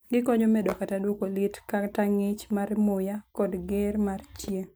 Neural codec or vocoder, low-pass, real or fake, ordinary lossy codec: none; none; real; none